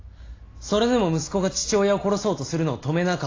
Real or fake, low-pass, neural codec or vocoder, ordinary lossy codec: real; 7.2 kHz; none; AAC, 32 kbps